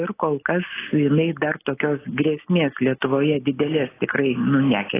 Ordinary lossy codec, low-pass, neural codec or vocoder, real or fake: AAC, 16 kbps; 3.6 kHz; none; real